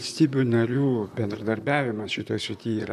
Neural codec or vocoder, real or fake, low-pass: vocoder, 44.1 kHz, 128 mel bands, Pupu-Vocoder; fake; 14.4 kHz